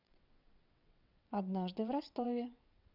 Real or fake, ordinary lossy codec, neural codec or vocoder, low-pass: fake; none; vocoder, 22.05 kHz, 80 mel bands, WaveNeXt; 5.4 kHz